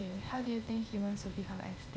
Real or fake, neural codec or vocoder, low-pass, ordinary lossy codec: fake; codec, 16 kHz, 0.8 kbps, ZipCodec; none; none